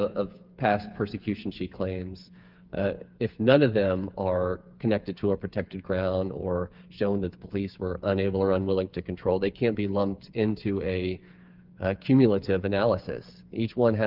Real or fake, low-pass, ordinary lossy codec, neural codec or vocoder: fake; 5.4 kHz; Opus, 24 kbps; codec, 16 kHz, 8 kbps, FreqCodec, smaller model